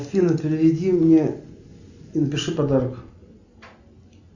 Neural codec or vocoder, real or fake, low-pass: none; real; 7.2 kHz